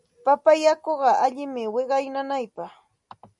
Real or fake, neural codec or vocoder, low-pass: real; none; 10.8 kHz